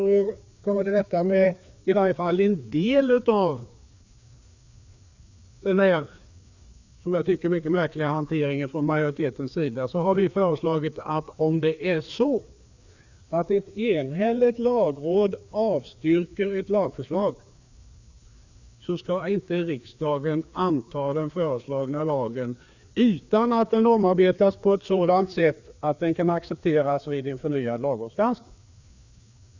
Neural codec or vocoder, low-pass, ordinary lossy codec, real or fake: codec, 16 kHz, 2 kbps, FreqCodec, larger model; 7.2 kHz; Opus, 64 kbps; fake